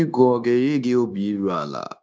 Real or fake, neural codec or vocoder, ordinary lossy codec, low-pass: fake; codec, 16 kHz, 0.9 kbps, LongCat-Audio-Codec; none; none